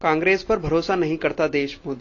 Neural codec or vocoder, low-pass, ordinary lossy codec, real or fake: none; 7.2 kHz; AAC, 32 kbps; real